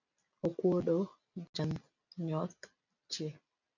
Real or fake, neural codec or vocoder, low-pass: real; none; 7.2 kHz